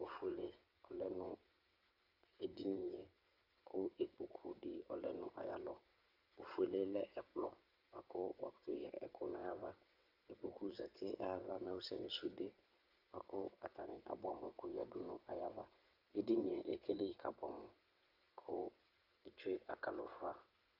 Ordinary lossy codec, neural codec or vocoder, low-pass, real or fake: Opus, 64 kbps; vocoder, 22.05 kHz, 80 mel bands, WaveNeXt; 5.4 kHz; fake